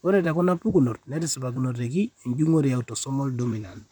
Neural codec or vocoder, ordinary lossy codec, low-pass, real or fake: vocoder, 44.1 kHz, 128 mel bands, Pupu-Vocoder; none; 19.8 kHz; fake